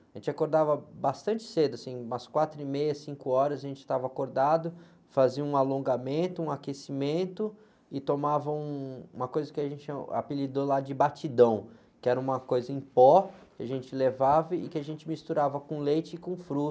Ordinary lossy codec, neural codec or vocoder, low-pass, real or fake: none; none; none; real